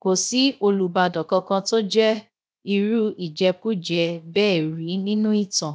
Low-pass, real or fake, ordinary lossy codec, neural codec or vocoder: none; fake; none; codec, 16 kHz, 0.7 kbps, FocalCodec